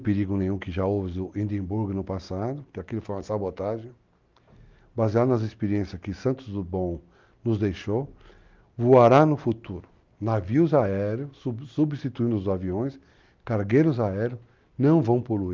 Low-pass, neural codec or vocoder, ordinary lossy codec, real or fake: 7.2 kHz; none; Opus, 32 kbps; real